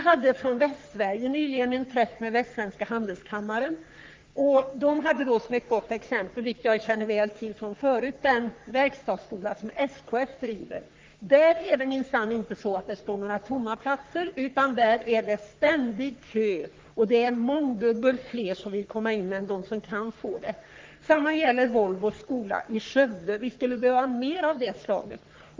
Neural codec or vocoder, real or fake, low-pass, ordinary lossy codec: codec, 44.1 kHz, 3.4 kbps, Pupu-Codec; fake; 7.2 kHz; Opus, 32 kbps